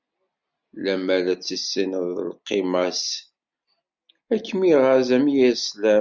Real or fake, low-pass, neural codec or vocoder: real; 7.2 kHz; none